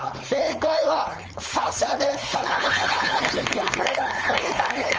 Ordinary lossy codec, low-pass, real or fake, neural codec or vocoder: Opus, 24 kbps; 7.2 kHz; fake; codec, 16 kHz, 4.8 kbps, FACodec